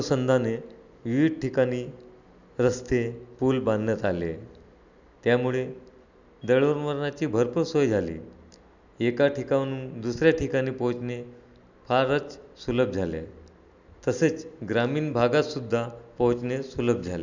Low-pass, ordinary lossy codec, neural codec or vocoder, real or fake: 7.2 kHz; none; none; real